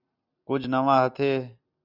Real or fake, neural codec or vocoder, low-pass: real; none; 5.4 kHz